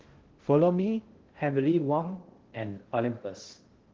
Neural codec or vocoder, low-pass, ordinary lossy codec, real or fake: codec, 16 kHz in and 24 kHz out, 0.6 kbps, FocalCodec, streaming, 2048 codes; 7.2 kHz; Opus, 16 kbps; fake